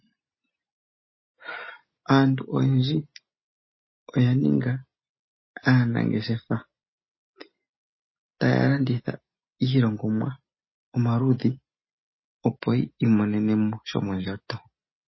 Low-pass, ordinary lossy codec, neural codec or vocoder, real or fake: 7.2 kHz; MP3, 24 kbps; none; real